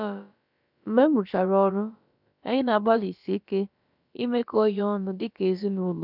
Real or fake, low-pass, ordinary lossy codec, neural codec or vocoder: fake; 5.4 kHz; none; codec, 16 kHz, about 1 kbps, DyCAST, with the encoder's durations